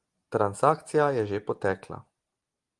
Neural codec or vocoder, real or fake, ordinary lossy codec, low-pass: none; real; Opus, 24 kbps; 10.8 kHz